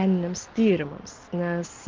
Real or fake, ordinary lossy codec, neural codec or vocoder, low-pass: real; Opus, 16 kbps; none; 7.2 kHz